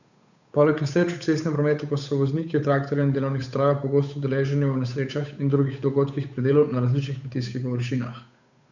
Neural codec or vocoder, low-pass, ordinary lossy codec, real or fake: codec, 16 kHz, 8 kbps, FunCodec, trained on Chinese and English, 25 frames a second; 7.2 kHz; none; fake